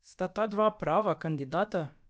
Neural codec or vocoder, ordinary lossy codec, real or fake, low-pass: codec, 16 kHz, about 1 kbps, DyCAST, with the encoder's durations; none; fake; none